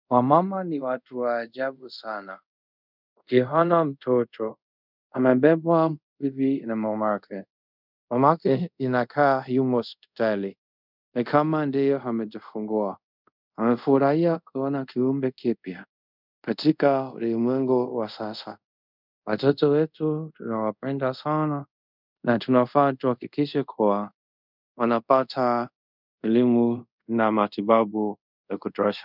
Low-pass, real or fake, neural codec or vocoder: 5.4 kHz; fake; codec, 24 kHz, 0.5 kbps, DualCodec